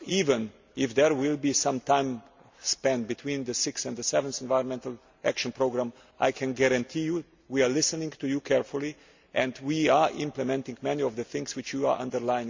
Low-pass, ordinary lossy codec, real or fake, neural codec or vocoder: 7.2 kHz; MP3, 64 kbps; real; none